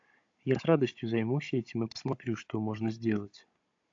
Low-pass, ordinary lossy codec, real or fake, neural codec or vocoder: 7.2 kHz; AAC, 48 kbps; fake; codec, 16 kHz, 16 kbps, FunCodec, trained on Chinese and English, 50 frames a second